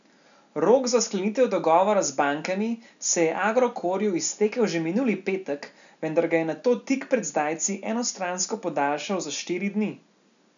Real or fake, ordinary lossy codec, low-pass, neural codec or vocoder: real; none; 7.2 kHz; none